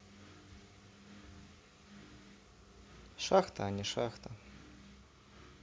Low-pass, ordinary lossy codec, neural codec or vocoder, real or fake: none; none; none; real